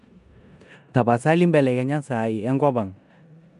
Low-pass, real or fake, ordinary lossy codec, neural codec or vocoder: 10.8 kHz; fake; none; codec, 16 kHz in and 24 kHz out, 0.9 kbps, LongCat-Audio-Codec, four codebook decoder